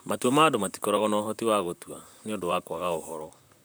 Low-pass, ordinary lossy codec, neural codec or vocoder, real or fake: none; none; vocoder, 44.1 kHz, 128 mel bands, Pupu-Vocoder; fake